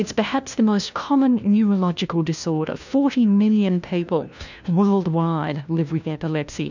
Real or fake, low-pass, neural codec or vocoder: fake; 7.2 kHz; codec, 16 kHz, 1 kbps, FunCodec, trained on LibriTTS, 50 frames a second